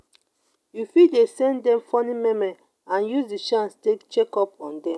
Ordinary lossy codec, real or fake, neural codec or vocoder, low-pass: none; real; none; none